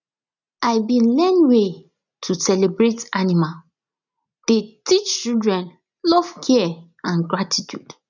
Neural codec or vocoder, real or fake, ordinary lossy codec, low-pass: none; real; none; 7.2 kHz